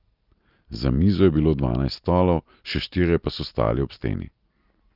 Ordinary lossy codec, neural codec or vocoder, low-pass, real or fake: Opus, 24 kbps; none; 5.4 kHz; real